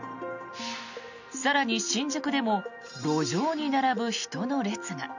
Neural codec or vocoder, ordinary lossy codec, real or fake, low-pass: none; none; real; 7.2 kHz